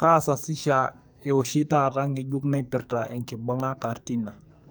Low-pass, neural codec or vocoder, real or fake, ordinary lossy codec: none; codec, 44.1 kHz, 2.6 kbps, SNAC; fake; none